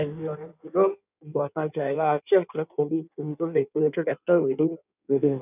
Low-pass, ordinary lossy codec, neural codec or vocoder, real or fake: 3.6 kHz; none; codec, 16 kHz in and 24 kHz out, 1.1 kbps, FireRedTTS-2 codec; fake